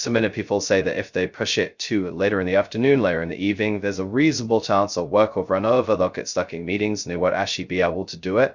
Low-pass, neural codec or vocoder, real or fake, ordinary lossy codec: 7.2 kHz; codec, 16 kHz, 0.2 kbps, FocalCodec; fake; Opus, 64 kbps